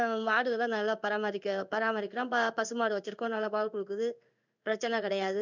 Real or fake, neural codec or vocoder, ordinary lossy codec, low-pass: fake; codec, 16 kHz in and 24 kHz out, 1 kbps, XY-Tokenizer; none; 7.2 kHz